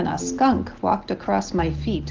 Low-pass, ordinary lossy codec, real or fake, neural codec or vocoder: 7.2 kHz; Opus, 16 kbps; real; none